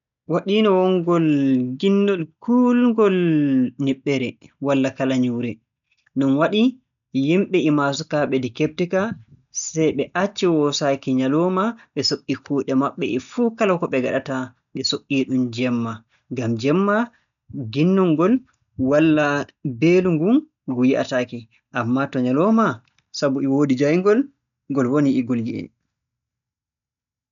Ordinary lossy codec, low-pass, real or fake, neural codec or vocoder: none; 7.2 kHz; real; none